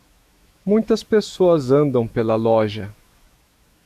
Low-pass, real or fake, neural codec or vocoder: 14.4 kHz; fake; autoencoder, 48 kHz, 128 numbers a frame, DAC-VAE, trained on Japanese speech